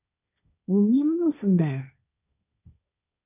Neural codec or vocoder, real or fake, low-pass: codec, 16 kHz, 1.1 kbps, Voila-Tokenizer; fake; 3.6 kHz